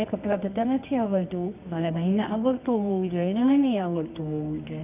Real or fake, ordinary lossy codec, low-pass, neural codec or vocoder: fake; none; 3.6 kHz; codec, 24 kHz, 0.9 kbps, WavTokenizer, medium music audio release